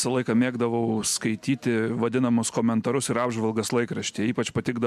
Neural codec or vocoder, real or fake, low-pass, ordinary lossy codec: none; real; 14.4 kHz; MP3, 96 kbps